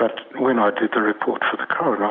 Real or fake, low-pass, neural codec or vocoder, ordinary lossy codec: real; 7.2 kHz; none; Opus, 64 kbps